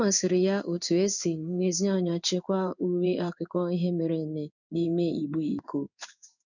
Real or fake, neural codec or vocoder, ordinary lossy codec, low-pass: fake; codec, 16 kHz in and 24 kHz out, 1 kbps, XY-Tokenizer; none; 7.2 kHz